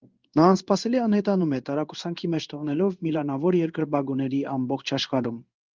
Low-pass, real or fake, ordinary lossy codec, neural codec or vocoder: 7.2 kHz; fake; Opus, 24 kbps; codec, 16 kHz in and 24 kHz out, 1 kbps, XY-Tokenizer